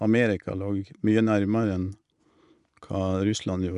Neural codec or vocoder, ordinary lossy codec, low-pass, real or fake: none; none; 10.8 kHz; real